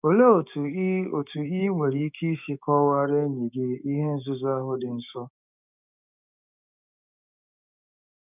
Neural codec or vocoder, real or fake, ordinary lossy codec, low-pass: codec, 16 kHz, 6 kbps, DAC; fake; none; 3.6 kHz